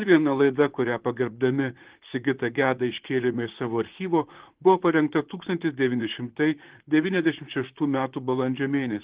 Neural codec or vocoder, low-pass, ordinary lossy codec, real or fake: vocoder, 44.1 kHz, 128 mel bands, Pupu-Vocoder; 3.6 kHz; Opus, 16 kbps; fake